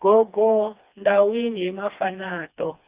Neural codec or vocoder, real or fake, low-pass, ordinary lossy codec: codec, 16 kHz, 2 kbps, FreqCodec, smaller model; fake; 3.6 kHz; Opus, 64 kbps